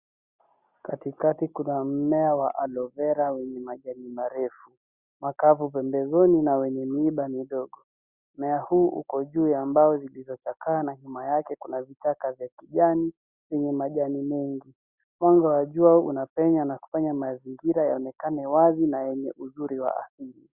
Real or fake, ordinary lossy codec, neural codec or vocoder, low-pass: real; Opus, 64 kbps; none; 3.6 kHz